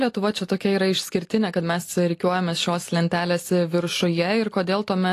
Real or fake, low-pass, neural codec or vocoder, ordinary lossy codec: real; 14.4 kHz; none; AAC, 48 kbps